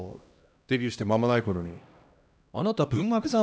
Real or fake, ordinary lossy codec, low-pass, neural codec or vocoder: fake; none; none; codec, 16 kHz, 1 kbps, X-Codec, HuBERT features, trained on LibriSpeech